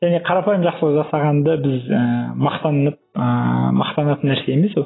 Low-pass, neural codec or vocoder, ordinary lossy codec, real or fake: 7.2 kHz; none; AAC, 16 kbps; real